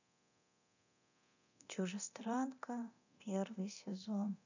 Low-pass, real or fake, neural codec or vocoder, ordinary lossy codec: 7.2 kHz; fake; codec, 24 kHz, 0.9 kbps, DualCodec; none